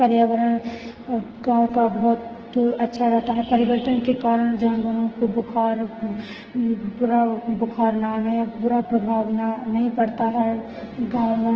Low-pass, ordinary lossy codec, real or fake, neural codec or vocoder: 7.2 kHz; Opus, 16 kbps; fake; codec, 44.1 kHz, 2.6 kbps, SNAC